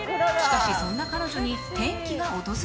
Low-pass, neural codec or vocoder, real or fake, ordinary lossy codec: none; none; real; none